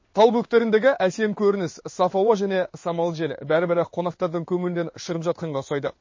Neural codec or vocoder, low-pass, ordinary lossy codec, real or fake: codec, 16 kHz in and 24 kHz out, 1 kbps, XY-Tokenizer; 7.2 kHz; MP3, 32 kbps; fake